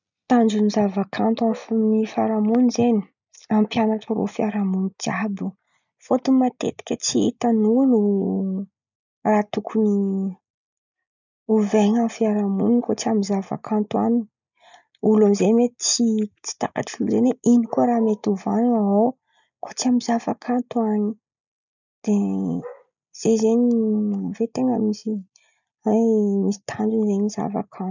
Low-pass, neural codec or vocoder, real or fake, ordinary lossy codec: 7.2 kHz; none; real; none